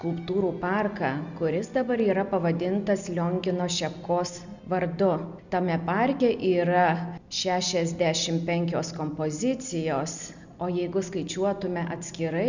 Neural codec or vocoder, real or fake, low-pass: none; real; 7.2 kHz